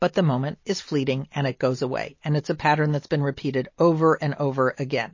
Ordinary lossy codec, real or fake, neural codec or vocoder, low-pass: MP3, 32 kbps; real; none; 7.2 kHz